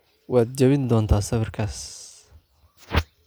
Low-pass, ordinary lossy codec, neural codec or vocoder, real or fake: none; none; none; real